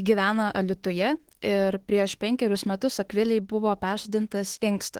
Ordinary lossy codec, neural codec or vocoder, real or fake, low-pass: Opus, 16 kbps; autoencoder, 48 kHz, 32 numbers a frame, DAC-VAE, trained on Japanese speech; fake; 19.8 kHz